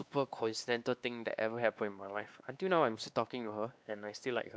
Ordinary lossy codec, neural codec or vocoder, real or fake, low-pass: none; codec, 16 kHz, 2 kbps, X-Codec, WavLM features, trained on Multilingual LibriSpeech; fake; none